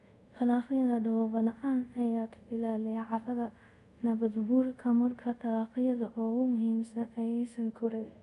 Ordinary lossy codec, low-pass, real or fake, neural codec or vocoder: AAC, 96 kbps; 10.8 kHz; fake; codec, 24 kHz, 0.5 kbps, DualCodec